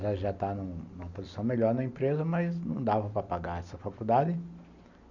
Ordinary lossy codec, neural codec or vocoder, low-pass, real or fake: none; none; 7.2 kHz; real